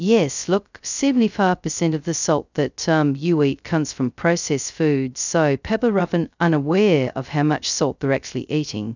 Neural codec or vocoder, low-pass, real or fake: codec, 16 kHz, 0.2 kbps, FocalCodec; 7.2 kHz; fake